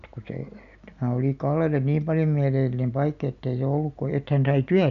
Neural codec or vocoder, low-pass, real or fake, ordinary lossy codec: none; 7.2 kHz; real; none